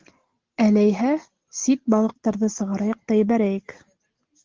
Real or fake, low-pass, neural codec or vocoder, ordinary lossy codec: real; 7.2 kHz; none; Opus, 16 kbps